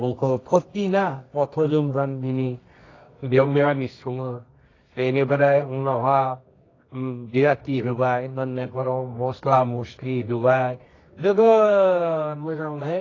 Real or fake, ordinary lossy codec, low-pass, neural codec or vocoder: fake; AAC, 32 kbps; 7.2 kHz; codec, 24 kHz, 0.9 kbps, WavTokenizer, medium music audio release